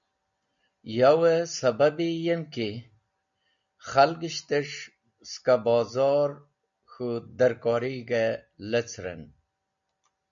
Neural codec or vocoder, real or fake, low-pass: none; real; 7.2 kHz